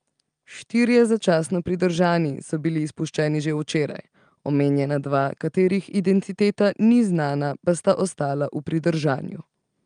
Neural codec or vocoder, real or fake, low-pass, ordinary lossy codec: none; real; 9.9 kHz; Opus, 32 kbps